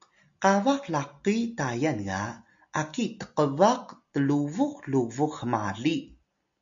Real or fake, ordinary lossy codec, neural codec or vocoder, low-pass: real; MP3, 64 kbps; none; 7.2 kHz